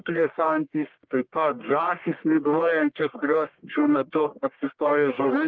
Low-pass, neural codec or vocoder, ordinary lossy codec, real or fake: 7.2 kHz; codec, 44.1 kHz, 1.7 kbps, Pupu-Codec; Opus, 32 kbps; fake